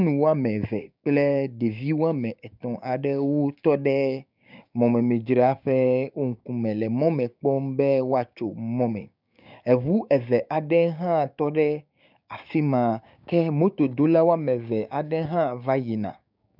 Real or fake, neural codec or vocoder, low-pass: fake; codec, 16 kHz, 6 kbps, DAC; 5.4 kHz